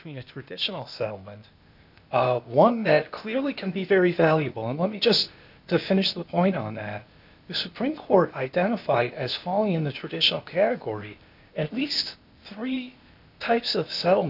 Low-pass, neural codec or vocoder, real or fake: 5.4 kHz; codec, 16 kHz, 0.8 kbps, ZipCodec; fake